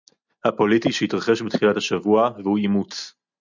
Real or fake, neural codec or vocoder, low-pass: real; none; 7.2 kHz